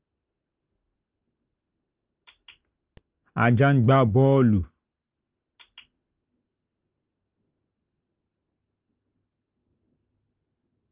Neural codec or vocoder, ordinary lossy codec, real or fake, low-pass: none; Opus, 24 kbps; real; 3.6 kHz